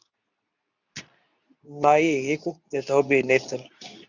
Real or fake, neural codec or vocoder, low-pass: fake; codec, 24 kHz, 0.9 kbps, WavTokenizer, medium speech release version 2; 7.2 kHz